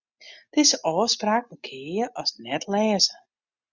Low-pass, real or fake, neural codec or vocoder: 7.2 kHz; real; none